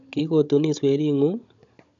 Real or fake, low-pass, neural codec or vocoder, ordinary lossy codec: fake; 7.2 kHz; codec, 16 kHz, 16 kbps, FunCodec, trained on Chinese and English, 50 frames a second; AAC, 64 kbps